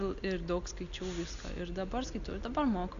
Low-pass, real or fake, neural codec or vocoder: 7.2 kHz; real; none